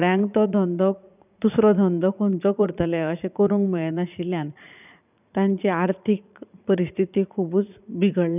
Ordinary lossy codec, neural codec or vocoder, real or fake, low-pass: none; vocoder, 44.1 kHz, 80 mel bands, Vocos; fake; 3.6 kHz